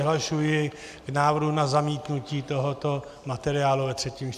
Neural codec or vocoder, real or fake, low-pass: none; real; 14.4 kHz